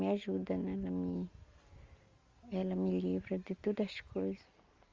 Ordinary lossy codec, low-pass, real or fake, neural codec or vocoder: Opus, 32 kbps; 7.2 kHz; real; none